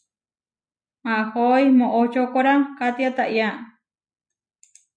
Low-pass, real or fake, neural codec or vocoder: 9.9 kHz; real; none